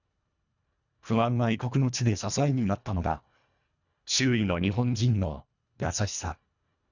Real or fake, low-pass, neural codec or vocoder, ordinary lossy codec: fake; 7.2 kHz; codec, 24 kHz, 1.5 kbps, HILCodec; none